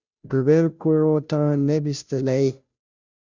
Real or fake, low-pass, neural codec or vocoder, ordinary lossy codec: fake; 7.2 kHz; codec, 16 kHz, 0.5 kbps, FunCodec, trained on Chinese and English, 25 frames a second; Opus, 64 kbps